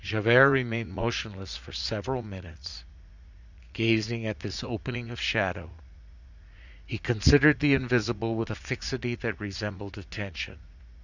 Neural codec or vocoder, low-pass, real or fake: vocoder, 44.1 kHz, 80 mel bands, Vocos; 7.2 kHz; fake